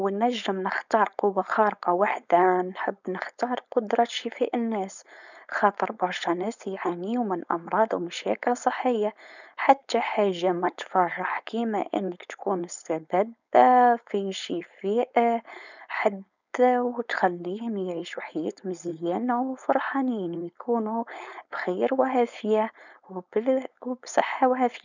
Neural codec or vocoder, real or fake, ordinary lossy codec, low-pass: codec, 16 kHz, 4.8 kbps, FACodec; fake; none; 7.2 kHz